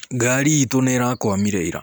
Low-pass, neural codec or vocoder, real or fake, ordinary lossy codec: none; none; real; none